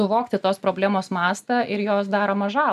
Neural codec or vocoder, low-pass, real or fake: none; 14.4 kHz; real